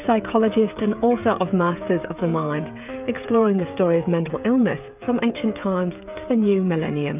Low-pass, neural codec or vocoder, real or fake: 3.6 kHz; codec, 16 kHz, 16 kbps, FreqCodec, smaller model; fake